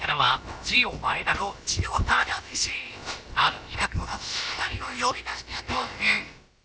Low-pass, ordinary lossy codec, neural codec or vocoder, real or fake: none; none; codec, 16 kHz, about 1 kbps, DyCAST, with the encoder's durations; fake